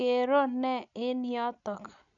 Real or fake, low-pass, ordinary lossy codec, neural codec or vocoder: real; 7.2 kHz; none; none